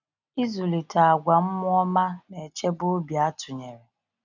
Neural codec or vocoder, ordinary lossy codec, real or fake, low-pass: none; none; real; 7.2 kHz